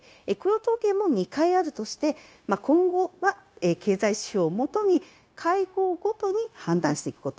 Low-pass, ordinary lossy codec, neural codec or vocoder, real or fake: none; none; codec, 16 kHz, 0.9 kbps, LongCat-Audio-Codec; fake